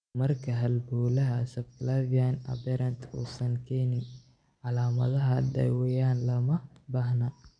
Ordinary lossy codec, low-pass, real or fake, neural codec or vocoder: none; 9.9 kHz; real; none